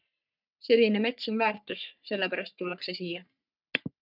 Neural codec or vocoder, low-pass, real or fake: codec, 44.1 kHz, 3.4 kbps, Pupu-Codec; 5.4 kHz; fake